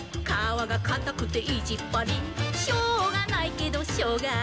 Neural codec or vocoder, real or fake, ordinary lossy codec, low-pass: none; real; none; none